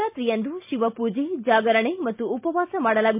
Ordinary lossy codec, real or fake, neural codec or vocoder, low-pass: MP3, 32 kbps; real; none; 3.6 kHz